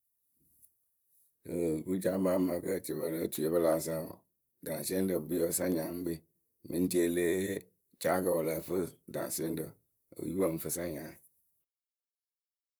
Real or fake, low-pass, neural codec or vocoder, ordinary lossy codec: fake; none; vocoder, 44.1 kHz, 128 mel bands, Pupu-Vocoder; none